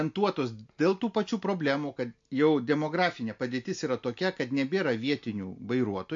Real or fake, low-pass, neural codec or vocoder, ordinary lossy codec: real; 7.2 kHz; none; MP3, 48 kbps